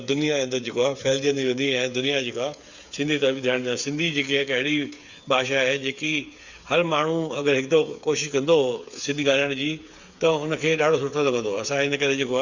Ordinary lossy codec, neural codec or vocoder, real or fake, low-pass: Opus, 64 kbps; codec, 16 kHz, 8 kbps, FreqCodec, smaller model; fake; 7.2 kHz